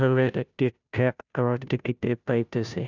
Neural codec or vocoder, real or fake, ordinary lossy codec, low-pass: codec, 16 kHz, 0.5 kbps, FunCodec, trained on Chinese and English, 25 frames a second; fake; none; 7.2 kHz